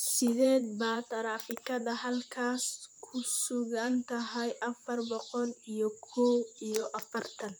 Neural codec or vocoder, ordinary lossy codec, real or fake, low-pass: vocoder, 44.1 kHz, 128 mel bands, Pupu-Vocoder; none; fake; none